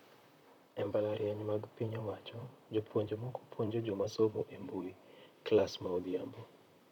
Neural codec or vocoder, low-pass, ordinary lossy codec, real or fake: vocoder, 44.1 kHz, 128 mel bands, Pupu-Vocoder; 19.8 kHz; none; fake